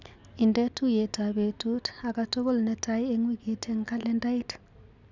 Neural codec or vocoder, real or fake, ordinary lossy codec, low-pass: none; real; none; 7.2 kHz